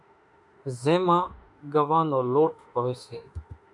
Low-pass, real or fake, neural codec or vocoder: 10.8 kHz; fake; autoencoder, 48 kHz, 32 numbers a frame, DAC-VAE, trained on Japanese speech